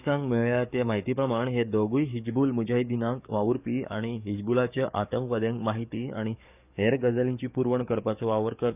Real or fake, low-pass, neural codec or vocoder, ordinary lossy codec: fake; 3.6 kHz; codec, 44.1 kHz, 7.8 kbps, DAC; none